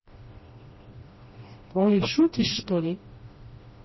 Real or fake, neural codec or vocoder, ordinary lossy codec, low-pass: fake; codec, 16 kHz, 1 kbps, FreqCodec, smaller model; MP3, 24 kbps; 7.2 kHz